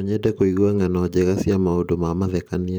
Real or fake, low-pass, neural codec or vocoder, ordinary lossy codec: fake; none; vocoder, 44.1 kHz, 128 mel bands every 512 samples, BigVGAN v2; none